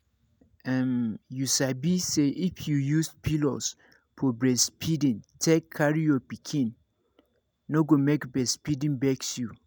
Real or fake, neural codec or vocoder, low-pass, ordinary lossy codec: real; none; none; none